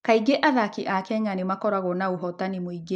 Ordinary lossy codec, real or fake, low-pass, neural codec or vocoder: none; real; 10.8 kHz; none